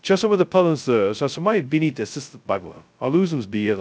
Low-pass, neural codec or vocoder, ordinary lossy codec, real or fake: none; codec, 16 kHz, 0.2 kbps, FocalCodec; none; fake